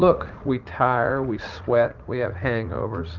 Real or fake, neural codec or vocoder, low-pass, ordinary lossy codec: fake; vocoder, 44.1 kHz, 80 mel bands, Vocos; 7.2 kHz; Opus, 24 kbps